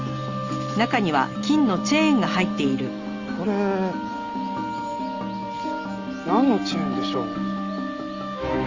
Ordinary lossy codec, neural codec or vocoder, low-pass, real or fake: Opus, 32 kbps; none; 7.2 kHz; real